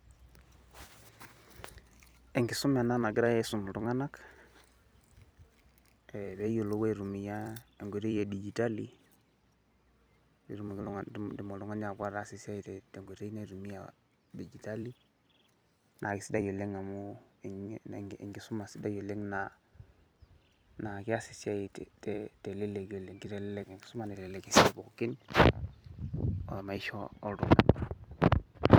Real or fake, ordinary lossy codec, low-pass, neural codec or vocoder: fake; none; none; vocoder, 44.1 kHz, 128 mel bands every 256 samples, BigVGAN v2